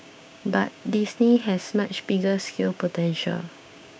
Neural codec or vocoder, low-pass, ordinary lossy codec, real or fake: codec, 16 kHz, 6 kbps, DAC; none; none; fake